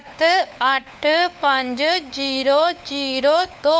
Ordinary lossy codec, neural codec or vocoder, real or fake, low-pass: none; codec, 16 kHz, 4 kbps, FunCodec, trained on LibriTTS, 50 frames a second; fake; none